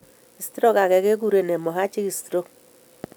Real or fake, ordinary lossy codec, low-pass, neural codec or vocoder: real; none; none; none